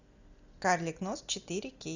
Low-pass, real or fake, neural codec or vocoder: 7.2 kHz; real; none